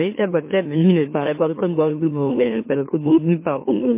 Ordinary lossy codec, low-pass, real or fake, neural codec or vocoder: MP3, 24 kbps; 3.6 kHz; fake; autoencoder, 44.1 kHz, a latent of 192 numbers a frame, MeloTTS